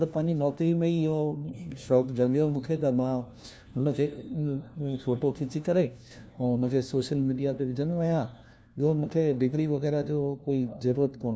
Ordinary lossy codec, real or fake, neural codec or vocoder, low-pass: none; fake; codec, 16 kHz, 1 kbps, FunCodec, trained on LibriTTS, 50 frames a second; none